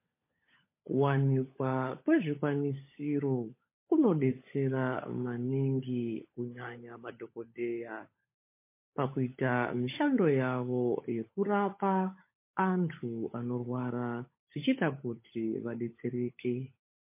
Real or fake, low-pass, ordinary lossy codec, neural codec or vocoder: fake; 3.6 kHz; MP3, 24 kbps; codec, 16 kHz, 16 kbps, FunCodec, trained on LibriTTS, 50 frames a second